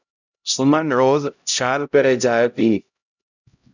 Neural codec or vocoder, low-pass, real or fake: codec, 16 kHz, 0.5 kbps, X-Codec, HuBERT features, trained on LibriSpeech; 7.2 kHz; fake